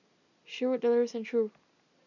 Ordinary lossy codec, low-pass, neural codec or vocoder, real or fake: none; 7.2 kHz; none; real